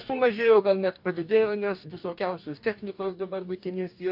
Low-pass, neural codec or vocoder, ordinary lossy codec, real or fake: 5.4 kHz; codec, 44.1 kHz, 2.6 kbps, DAC; MP3, 48 kbps; fake